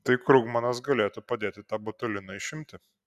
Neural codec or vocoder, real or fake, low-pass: none; real; 14.4 kHz